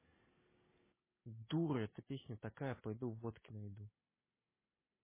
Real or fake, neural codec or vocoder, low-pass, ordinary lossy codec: real; none; 3.6 kHz; MP3, 16 kbps